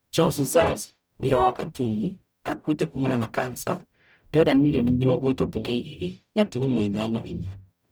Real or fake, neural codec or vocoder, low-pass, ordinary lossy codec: fake; codec, 44.1 kHz, 0.9 kbps, DAC; none; none